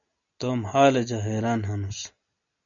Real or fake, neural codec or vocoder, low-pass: real; none; 7.2 kHz